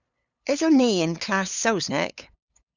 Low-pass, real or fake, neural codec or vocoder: 7.2 kHz; fake; codec, 16 kHz, 8 kbps, FunCodec, trained on LibriTTS, 25 frames a second